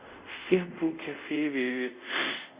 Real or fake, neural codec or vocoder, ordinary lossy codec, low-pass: fake; codec, 24 kHz, 0.5 kbps, DualCodec; Opus, 64 kbps; 3.6 kHz